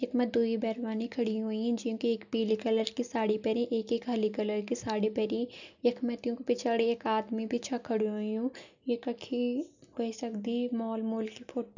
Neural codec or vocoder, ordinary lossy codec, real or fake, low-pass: none; none; real; 7.2 kHz